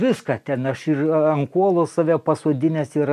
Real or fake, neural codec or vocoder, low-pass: real; none; 14.4 kHz